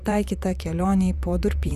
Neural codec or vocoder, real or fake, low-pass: vocoder, 44.1 kHz, 128 mel bands, Pupu-Vocoder; fake; 14.4 kHz